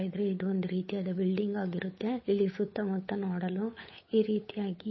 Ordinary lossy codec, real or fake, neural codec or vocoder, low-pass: MP3, 24 kbps; fake; codec, 16 kHz, 8 kbps, FunCodec, trained on Chinese and English, 25 frames a second; 7.2 kHz